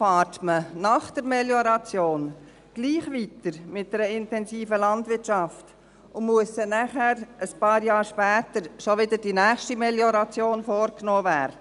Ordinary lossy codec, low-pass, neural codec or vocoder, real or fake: none; 10.8 kHz; none; real